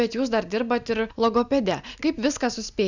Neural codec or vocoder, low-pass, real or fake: none; 7.2 kHz; real